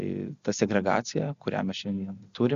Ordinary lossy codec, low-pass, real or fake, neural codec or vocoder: Opus, 64 kbps; 7.2 kHz; real; none